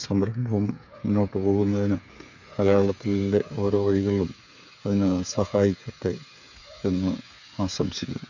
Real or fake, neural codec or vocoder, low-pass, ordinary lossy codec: fake; codec, 16 kHz, 8 kbps, FreqCodec, smaller model; 7.2 kHz; none